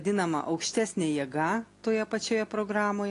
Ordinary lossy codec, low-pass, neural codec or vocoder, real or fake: AAC, 48 kbps; 10.8 kHz; none; real